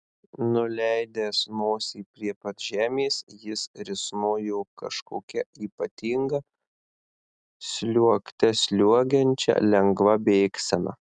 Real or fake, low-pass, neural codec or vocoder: real; 9.9 kHz; none